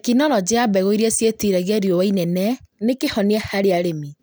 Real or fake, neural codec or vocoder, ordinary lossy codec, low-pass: real; none; none; none